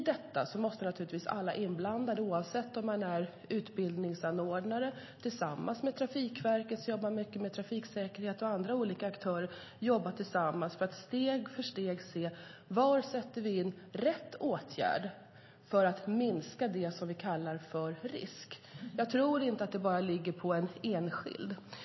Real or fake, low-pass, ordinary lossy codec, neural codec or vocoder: real; 7.2 kHz; MP3, 24 kbps; none